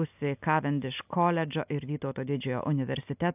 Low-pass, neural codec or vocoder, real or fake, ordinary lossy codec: 3.6 kHz; none; real; AAC, 32 kbps